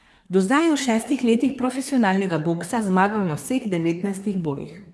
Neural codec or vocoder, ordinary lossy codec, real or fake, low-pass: codec, 24 kHz, 1 kbps, SNAC; none; fake; none